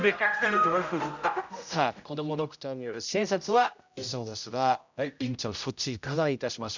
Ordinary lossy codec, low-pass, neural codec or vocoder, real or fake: none; 7.2 kHz; codec, 16 kHz, 0.5 kbps, X-Codec, HuBERT features, trained on general audio; fake